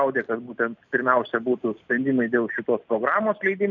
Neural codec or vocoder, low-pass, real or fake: none; 7.2 kHz; real